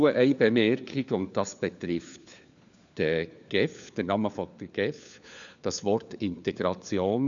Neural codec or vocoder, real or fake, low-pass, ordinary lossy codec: codec, 16 kHz, 4 kbps, FunCodec, trained on LibriTTS, 50 frames a second; fake; 7.2 kHz; none